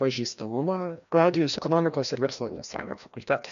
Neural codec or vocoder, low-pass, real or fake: codec, 16 kHz, 1 kbps, FreqCodec, larger model; 7.2 kHz; fake